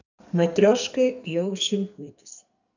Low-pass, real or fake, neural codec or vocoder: 7.2 kHz; fake; codec, 44.1 kHz, 2.6 kbps, SNAC